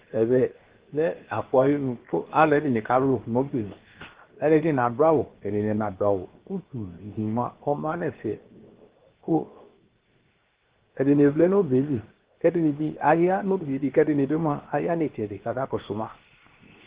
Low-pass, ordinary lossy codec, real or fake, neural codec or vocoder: 3.6 kHz; Opus, 16 kbps; fake; codec, 16 kHz, 0.7 kbps, FocalCodec